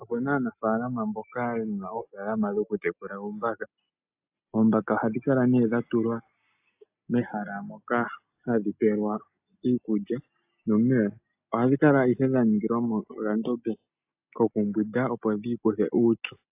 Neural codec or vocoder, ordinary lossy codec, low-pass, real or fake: none; AAC, 32 kbps; 3.6 kHz; real